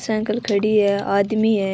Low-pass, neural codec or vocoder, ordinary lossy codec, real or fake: none; none; none; real